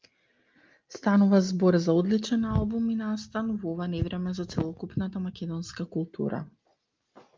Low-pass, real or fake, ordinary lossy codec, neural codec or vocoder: 7.2 kHz; real; Opus, 32 kbps; none